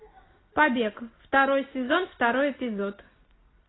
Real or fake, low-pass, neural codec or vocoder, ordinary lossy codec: real; 7.2 kHz; none; AAC, 16 kbps